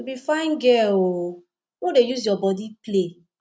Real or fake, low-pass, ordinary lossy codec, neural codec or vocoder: real; none; none; none